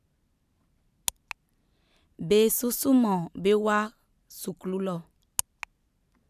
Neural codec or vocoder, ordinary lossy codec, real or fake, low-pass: vocoder, 44.1 kHz, 128 mel bands every 512 samples, BigVGAN v2; none; fake; 14.4 kHz